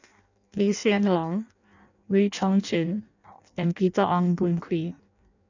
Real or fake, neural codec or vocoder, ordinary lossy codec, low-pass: fake; codec, 16 kHz in and 24 kHz out, 0.6 kbps, FireRedTTS-2 codec; none; 7.2 kHz